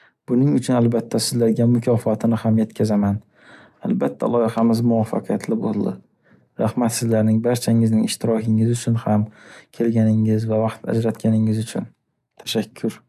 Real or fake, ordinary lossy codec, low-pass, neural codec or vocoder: real; none; 14.4 kHz; none